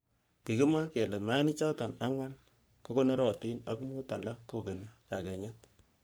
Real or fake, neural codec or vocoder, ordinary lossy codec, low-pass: fake; codec, 44.1 kHz, 3.4 kbps, Pupu-Codec; none; none